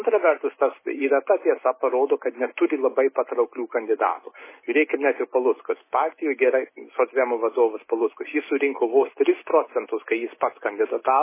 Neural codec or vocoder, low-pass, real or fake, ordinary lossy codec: none; 3.6 kHz; real; MP3, 16 kbps